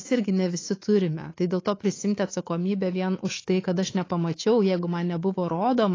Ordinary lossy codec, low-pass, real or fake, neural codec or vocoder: AAC, 32 kbps; 7.2 kHz; fake; codec, 24 kHz, 3.1 kbps, DualCodec